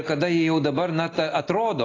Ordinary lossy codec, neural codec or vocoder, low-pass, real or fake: AAC, 32 kbps; none; 7.2 kHz; real